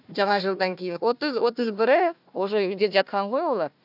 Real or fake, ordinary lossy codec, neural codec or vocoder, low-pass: fake; none; codec, 16 kHz, 1 kbps, FunCodec, trained on Chinese and English, 50 frames a second; 5.4 kHz